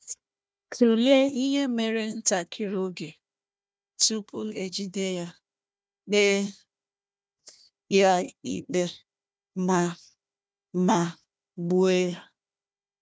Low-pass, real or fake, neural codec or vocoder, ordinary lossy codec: none; fake; codec, 16 kHz, 1 kbps, FunCodec, trained on Chinese and English, 50 frames a second; none